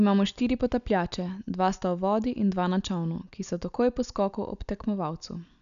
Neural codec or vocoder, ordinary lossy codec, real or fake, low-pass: none; none; real; 7.2 kHz